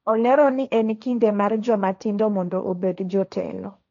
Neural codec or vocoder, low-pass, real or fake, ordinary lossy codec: codec, 16 kHz, 1.1 kbps, Voila-Tokenizer; 7.2 kHz; fake; none